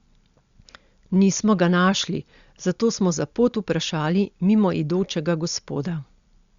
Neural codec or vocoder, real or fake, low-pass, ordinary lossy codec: none; real; 7.2 kHz; Opus, 64 kbps